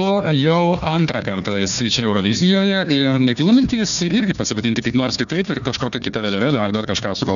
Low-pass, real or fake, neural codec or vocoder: 7.2 kHz; fake; codec, 16 kHz, 1 kbps, FunCodec, trained on Chinese and English, 50 frames a second